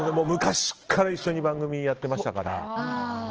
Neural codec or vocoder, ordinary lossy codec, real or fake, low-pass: none; Opus, 16 kbps; real; 7.2 kHz